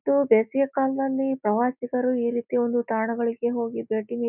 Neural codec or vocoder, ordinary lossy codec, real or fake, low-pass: none; none; real; 3.6 kHz